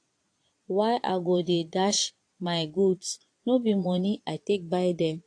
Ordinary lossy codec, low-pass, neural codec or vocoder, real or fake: AAC, 48 kbps; 9.9 kHz; vocoder, 22.05 kHz, 80 mel bands, Vocos; fake